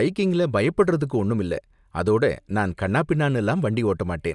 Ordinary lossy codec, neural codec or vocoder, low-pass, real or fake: none; none; 10.8 kHz; real